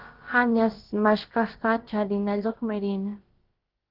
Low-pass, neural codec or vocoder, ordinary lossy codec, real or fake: 5.4 kHz; codec, 16 kHz, about 1 kbps, DyCAST, with the encoder's durations; Opus, 16 kbps; fake